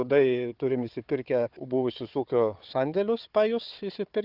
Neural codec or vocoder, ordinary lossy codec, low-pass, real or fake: codec, 16 kHz, 4 kbps, FunCodec, trained on Chinese and English, 50 frames a second; Opus, 32 kbps; 5.4 kHz; fake